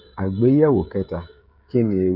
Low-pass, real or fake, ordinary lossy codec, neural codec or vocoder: 5.4 kHz; fake; none; codec, 16 kHz, 16 kbps, FreqCodec, smaller model